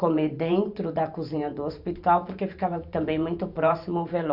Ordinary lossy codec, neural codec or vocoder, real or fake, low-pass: none; vocoder, 44.1 kHz, 128 mel bands every 512 samples, BigVGAN v2; fake; 5.4 kHz